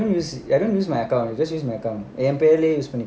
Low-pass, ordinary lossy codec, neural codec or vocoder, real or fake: none; none; none; real